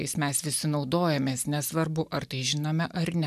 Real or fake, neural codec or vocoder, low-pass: real; none; 14.4 kHz